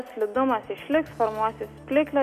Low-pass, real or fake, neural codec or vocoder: 14.4 kHz; real; none